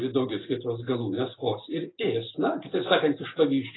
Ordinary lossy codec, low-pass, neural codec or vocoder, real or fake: AAC, 16 kbps; 7.2 kHz; none; real